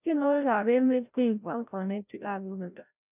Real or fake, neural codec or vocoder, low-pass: fake; codec, 16 kHz, 0.5 kbps, FreqCodec, larger model; 3.6 kHz